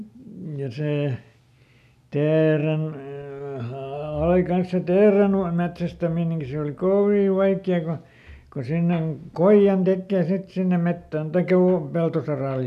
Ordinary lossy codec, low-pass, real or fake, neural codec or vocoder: none; 14.4 kHz; real; none